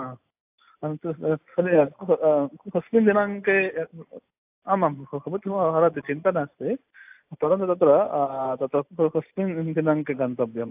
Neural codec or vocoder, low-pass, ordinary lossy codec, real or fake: none; 3.6 kHz; AAC, 32 kbps; real